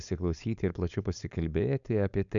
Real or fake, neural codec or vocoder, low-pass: fake; codec, 16 kHz, 4.8 kbps, FACodec; 7.2 kHz